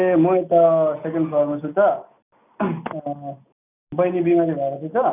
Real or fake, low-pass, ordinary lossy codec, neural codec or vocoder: real; 3.6 kHz; none; none